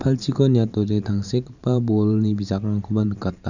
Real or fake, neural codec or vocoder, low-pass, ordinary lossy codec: fake; vocoder, 44.1 kHz, 128 mel bands every 512 samples, BigVGAN v2; 7.2 kHz; none